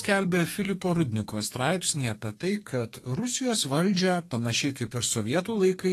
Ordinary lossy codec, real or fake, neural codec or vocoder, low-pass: AAC, 48 kbps; fake; codec, 32 kHz, 1.9 kbps, SNAC; 14.4 kHz